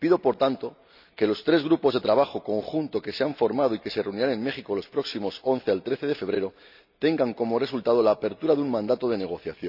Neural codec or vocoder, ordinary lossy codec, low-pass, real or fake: none; none; 5.4 kHz; real